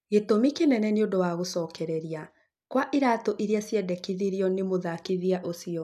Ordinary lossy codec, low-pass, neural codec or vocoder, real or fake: none; 14.4 kHz; none; real